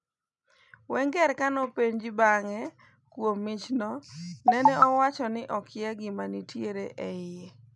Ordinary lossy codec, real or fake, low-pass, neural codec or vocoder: none; real; 10.8 kHz; none